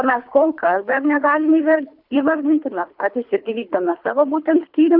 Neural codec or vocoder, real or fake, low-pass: codec, 24 kHz, 3 kbps, HILCodec; fake; 5.4 kHz